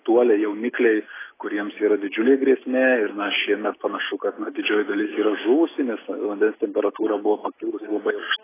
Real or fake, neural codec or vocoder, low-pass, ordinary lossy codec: real; none; 3.6 kHz; AAC, 16 kbps